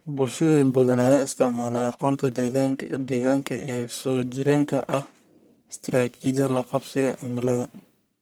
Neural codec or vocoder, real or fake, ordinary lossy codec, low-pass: codec, 44.1 kHz, 1.7 kbps, Pupu-Codec; fake; none; none